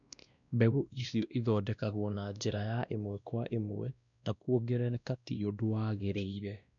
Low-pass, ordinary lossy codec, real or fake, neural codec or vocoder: 7.2 kHz; MP3, 96 kbps; fake; codec, 16 kHz, 1 kbps, X-Codec, WavLM features, trained on Multilingual LibriSpeech